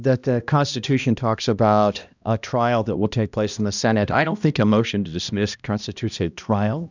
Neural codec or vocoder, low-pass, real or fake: codec, 16 kHz, 1 kbps, X-Codec, HuBERT features, trained on balanced general audio; 7.2 kHz; fake